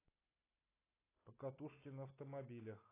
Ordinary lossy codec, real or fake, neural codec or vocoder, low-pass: AAC, 16 kbps; real; none; 3.6 kHz